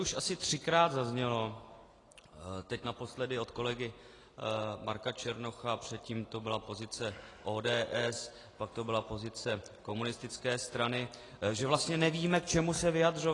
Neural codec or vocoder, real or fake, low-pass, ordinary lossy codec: none; real; 10.8 kHz; AAC, 32 kbps